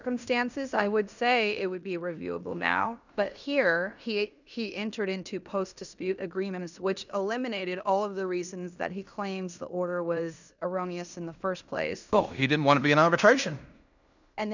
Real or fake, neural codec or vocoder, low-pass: fake; codec, 16 kHz in and 24 kHz out, 0.9 kbps, LongCat-Audio-Codec, fine tuned four codebook decoder; 7.2 kHz